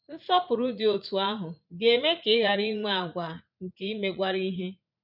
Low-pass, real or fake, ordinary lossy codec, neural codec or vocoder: 5.4 kHz; fake; none; vocoder, 44.1 kHz, 80 mel bands, Vocos